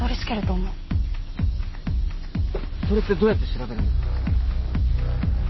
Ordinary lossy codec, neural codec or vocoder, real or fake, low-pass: MP3, 24 kbps; none; real; 7.2 kHz